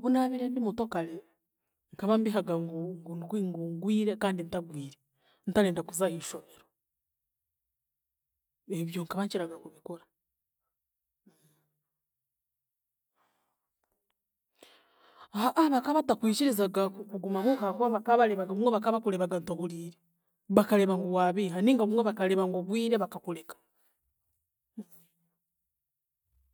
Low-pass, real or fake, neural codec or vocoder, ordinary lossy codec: none; real; none; none